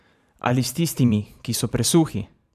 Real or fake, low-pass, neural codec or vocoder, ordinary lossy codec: fake; 14.4 kHz; vocoder, 44.1 kHz, 128 mel bands every 512 samples, BigVGAN v2; none